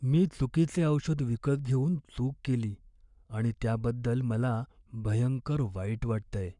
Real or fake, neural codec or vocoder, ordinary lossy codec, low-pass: fake; codec, 44.1 kHz, 7.8 kbps, Pupu-Codec; none; 10.8 kHz